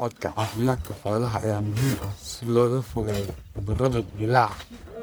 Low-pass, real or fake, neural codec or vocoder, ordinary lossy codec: none; fake; codec, 44.1 kHz, 1.7 kbps, Pupu-Codec; none